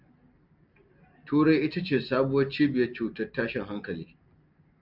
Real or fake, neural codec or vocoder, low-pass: real; none; 5.4 kHz